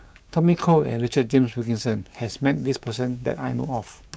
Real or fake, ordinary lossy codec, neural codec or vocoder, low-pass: fake; none; codec, 16 kHz, 6 kbps, DAC; none